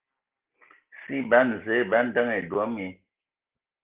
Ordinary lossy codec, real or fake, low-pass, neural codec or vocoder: Opus, 16 kbps; real; 3.6 kHz; none